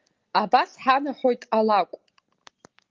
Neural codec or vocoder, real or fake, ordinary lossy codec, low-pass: none; real; Opus, 24 kbps; 7.2 kHz